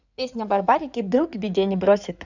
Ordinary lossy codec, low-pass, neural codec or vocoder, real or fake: none; 7.2 kHz; codec, 16 kHz in and 24 kHz out, 2.2 kbps, FireRedTTS-2 codec; fake